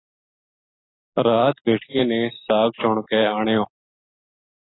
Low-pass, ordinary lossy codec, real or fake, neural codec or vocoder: 7.2 kHz; AAC, 16 kbps; real; none